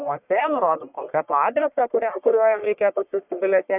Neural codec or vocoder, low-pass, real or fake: codec, 44.1 kHz, 1.7 kbps, Pupu-Codec; 3.6 kHz; fake